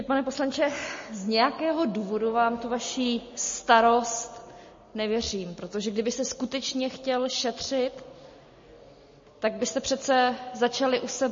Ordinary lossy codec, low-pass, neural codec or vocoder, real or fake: MP3, 32 kbps; 7.2 kHz; none; real